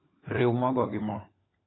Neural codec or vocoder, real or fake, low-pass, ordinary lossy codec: codec, 16 kHz, 16 kbps, FunCodec, trained on LibriTTS, 50 frames a second; fake; 7.2 kHz; AAC, 16 kbps